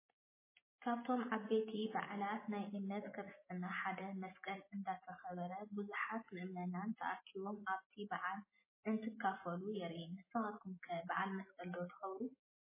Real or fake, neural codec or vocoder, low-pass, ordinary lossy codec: real; none; 3.6 kHz; MP3, 16 kbps